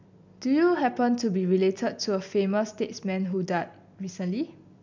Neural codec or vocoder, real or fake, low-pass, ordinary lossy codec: none; real; 7.2 kHz; MP3, 64 kbps